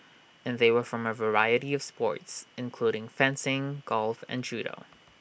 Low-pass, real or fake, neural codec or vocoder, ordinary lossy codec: none; real; none; none